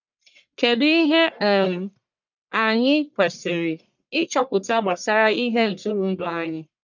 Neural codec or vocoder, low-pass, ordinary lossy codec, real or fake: codec, 44.1 kHz, 1.7 kbps, Pupu-Codec; 7.2 kHz; none; fake